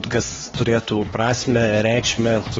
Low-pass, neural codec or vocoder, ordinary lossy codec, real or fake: 7.2 kHz; codec, 16 kHz, 2 kbps, X-Codec, HuBERT features, trained on LibriSpeech; AAC, 24 kbps; fake